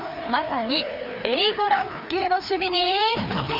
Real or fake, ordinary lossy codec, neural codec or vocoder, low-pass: fake; none; codec, 16 kHz, 2 kbps, FreqCodec, larger model; 5.4 kHz